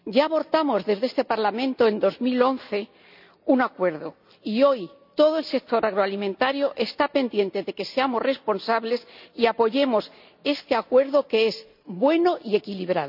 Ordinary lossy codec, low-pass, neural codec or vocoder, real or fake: none; 5.4 kHz; none; real